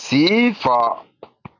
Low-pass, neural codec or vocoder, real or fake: 7.2 kHz; none; real